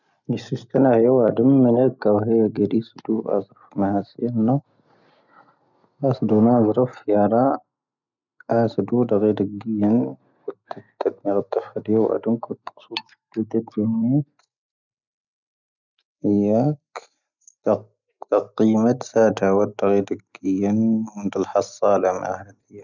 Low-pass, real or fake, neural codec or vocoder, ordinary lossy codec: 7.2 kHz; real; none; none